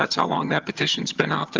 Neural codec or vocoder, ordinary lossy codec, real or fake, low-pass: vocoder, 22.05 kHz, 80 mel bands, HiFi-GAN; Opus, 24 kbps; fake; 7.2 kHz